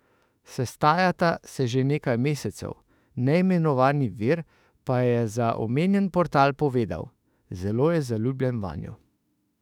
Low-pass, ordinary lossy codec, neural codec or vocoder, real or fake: 19.8 kHz; none; autoencoder, 48 kHz, 32 numbers a frame, DAC-VAE, trained on Japanese speech; fake